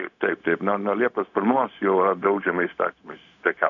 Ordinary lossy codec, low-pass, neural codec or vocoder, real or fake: MP3, 96 kbps; 7.2 kHz; codec, 16 kHz, 0.4 kbps, LongCat-Audio-Codec; fake